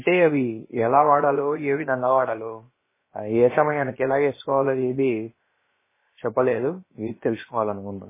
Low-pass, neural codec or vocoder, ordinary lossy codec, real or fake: 3.6 kHz; codec, 16 kHz, about 1 kbps, DyCAST, with the encoder's durations; MP3, 16 kbps; fake